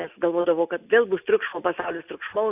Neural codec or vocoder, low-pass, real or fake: none; 3.6 kHz; real